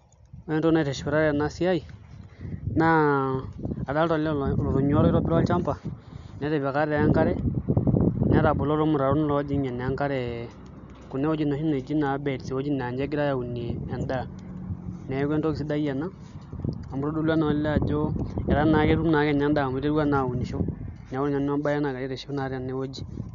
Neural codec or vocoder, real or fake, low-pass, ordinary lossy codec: none; real; 7.2 kHz; none